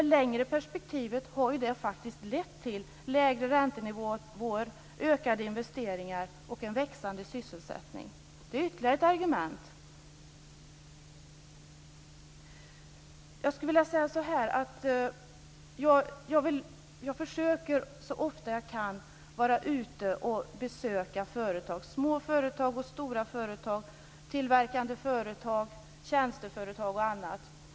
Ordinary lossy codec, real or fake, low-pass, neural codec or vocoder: none; real; none; none